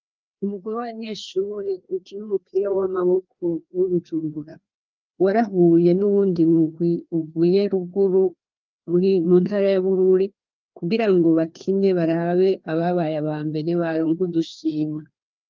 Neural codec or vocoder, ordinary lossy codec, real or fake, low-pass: codec, 16 kHz, 2 kbps, FreqCodec, larger model; Opus, 32 kbps; fake; 7.2 kHz